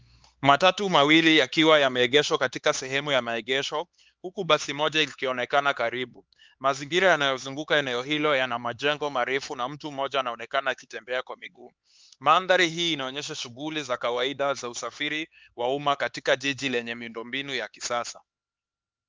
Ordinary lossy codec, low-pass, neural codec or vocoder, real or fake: Opus, 32 kbps; 7.2 kHz; codec, 16 kHz, 4 kbps, X-Codec, HuBERT features, trained on LibriSpeech; fake